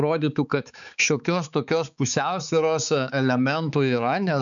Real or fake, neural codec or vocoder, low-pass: fake; codec, 16 kHz, 4 kbps, X-Codec, HuBERT features, trained on balanced general audio; 7.2 kHz